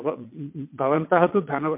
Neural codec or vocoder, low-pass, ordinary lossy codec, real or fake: vocoder, 22.05 kHz, 80 mel bands, WaveNeXt; 3.6 kHz; MP3, 32 kbps; fake